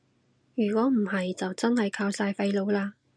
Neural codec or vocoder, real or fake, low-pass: none; real; 9.9 kHz